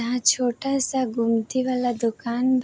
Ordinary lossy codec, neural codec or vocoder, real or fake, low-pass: none; none; real; none